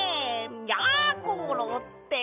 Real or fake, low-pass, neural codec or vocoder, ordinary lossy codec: real; 3.6 kHz; none; none